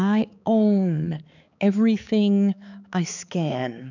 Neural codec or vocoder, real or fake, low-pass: codec, 16 kHz, 4 kbps, X-Codec, HuBERT features, trained on balanced general audio; fake; 7.2 kHz